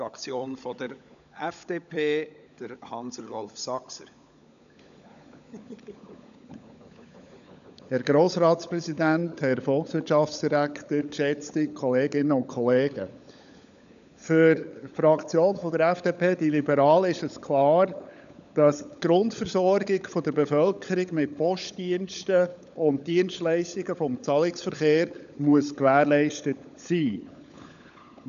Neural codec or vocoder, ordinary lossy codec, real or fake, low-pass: codec, 16 kHz, 16 kbps, FunCodec, trained on LibriTTS, 50 frames a second; none; fake; 7.2 kHz